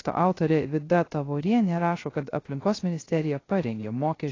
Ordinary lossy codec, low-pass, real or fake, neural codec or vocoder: AAC, 32 kbps; 7.2 kHz; fake; codec, 16 kHz, 0.3 kbps, FocalCodec